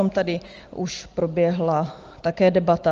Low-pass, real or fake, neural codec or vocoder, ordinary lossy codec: 7.2 kHz; real; none; Opus, 24 kbps